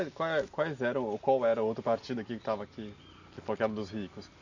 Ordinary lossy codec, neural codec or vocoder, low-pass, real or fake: none; none; 7.2 kHz; real